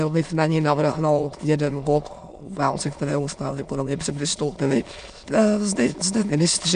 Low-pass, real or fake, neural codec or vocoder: 9.9 kHz; fake; autoencoder, 22.05 kHz, a latent of 192 numbers a frame, VITS, trained on many speakers